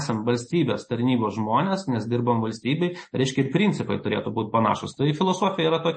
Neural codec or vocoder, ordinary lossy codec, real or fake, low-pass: none; MP3, 32 kbps; real; 9.9 kHz